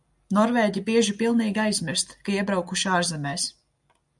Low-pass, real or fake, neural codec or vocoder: 10.8 kHz; real; none